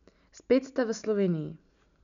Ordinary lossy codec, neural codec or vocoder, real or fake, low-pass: none; none; real; 7.2 kHz